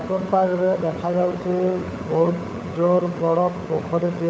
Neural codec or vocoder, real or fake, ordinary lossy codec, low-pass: codec, 16 kHz, 16 kbps, FunCodec, trained on LibriTTS, 50 frames a second; fake; none; none